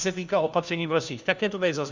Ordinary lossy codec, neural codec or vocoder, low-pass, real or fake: Opus, 64 kbps; codec, 16 kHz, 1 kbps, FunCodec, trained on LibriTTS, 50 frames a second; 7.2 kHz; fake